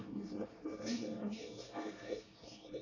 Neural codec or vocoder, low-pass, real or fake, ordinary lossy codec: codec, 24 kHz, 1 kbps, SNAC; 7.2 kHz; fake; AAC, 32 kbps